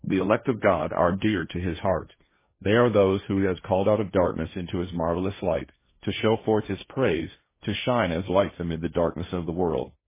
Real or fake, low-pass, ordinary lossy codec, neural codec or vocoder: fake; 3.6 kHz; MP3, 16 kbps; codec, 16 kHz, 1.1 kbps, Voila-Tokenizer